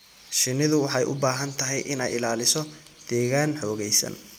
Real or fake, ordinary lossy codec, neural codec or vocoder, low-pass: real; none; none; none